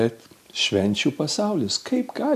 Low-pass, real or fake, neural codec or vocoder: 14.4 kHz; real; none